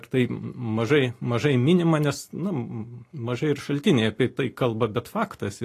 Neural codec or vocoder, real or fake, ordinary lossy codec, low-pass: none; real; AAC, 48 kbps; 14.4 kHz